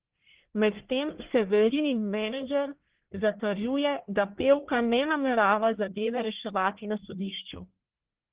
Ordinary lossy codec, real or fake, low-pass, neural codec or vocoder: Opus, 16 kbps; fake; 3.6 kHz; codec, 44.1 kHz, 1.7 kbps, Pupu-Codec